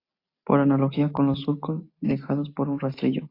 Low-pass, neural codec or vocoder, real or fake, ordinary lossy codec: 5.4 kHz; none; real; AAC, 32 kbps